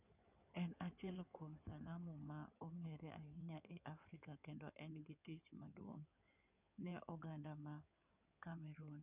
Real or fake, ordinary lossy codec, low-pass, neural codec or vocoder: fake; none; 3.6 kHz; codec, 16 kHz in and 24 kHz out, 2.2 kbps, FireRedTTS-2 codec